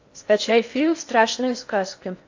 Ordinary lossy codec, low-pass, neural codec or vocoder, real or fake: AAC, 48 kbps; 7.2 kHz; codec, 16 kHz in and 24 kHz out, 0.6 kbps, FocalCodec, streaming, 4096 codes; fake